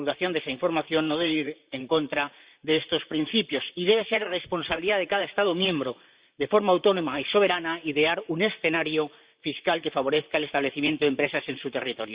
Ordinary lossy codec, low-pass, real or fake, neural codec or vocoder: Opus, 64 kbps; 3.6 kHz; fake; vocoder, 44.1 kHz, 128 mel bands, Pupu-Vocoder